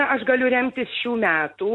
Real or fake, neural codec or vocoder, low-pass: real; none; 10.8 kHz